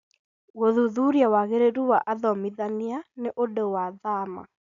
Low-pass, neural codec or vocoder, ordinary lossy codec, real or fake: 7.2 kHz; none; none; real